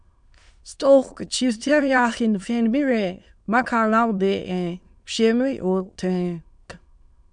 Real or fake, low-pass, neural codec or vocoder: fake; 9.9 kHz; autoencoder, 22.05 kHz, a latent of 192 numbers a frame, VITS, trained on many speakers